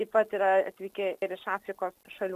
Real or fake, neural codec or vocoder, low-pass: real; none; 14.4 kHz